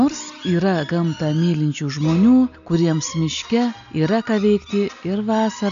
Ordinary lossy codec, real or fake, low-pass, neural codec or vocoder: AAC, 96 kbps; real; 7.2 kHz; none